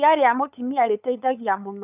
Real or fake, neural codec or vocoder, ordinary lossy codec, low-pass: fake; codec, 16 kHz, 8 kbps, FunCodec, trained on LibriTTS, 25 frames a second; none; 3.6 kHz